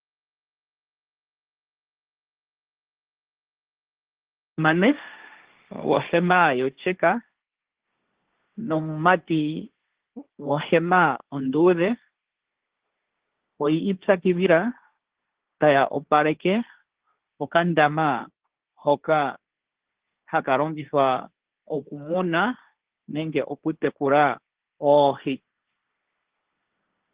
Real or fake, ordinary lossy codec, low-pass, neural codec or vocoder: fake; Opus, 16 kbps; 3.6 kHz; codec, 16 kHz, 1.1 kbps, Voila-Tokenizer